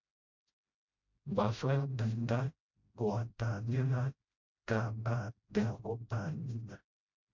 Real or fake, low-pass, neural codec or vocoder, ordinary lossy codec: fake; 7.2 kHz; codec, 16 kHz, 0.5 kbps, FreqCodec, smaller model; MP3, 64 kbps